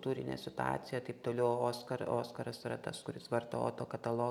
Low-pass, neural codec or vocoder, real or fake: 19.8 kHz; none; real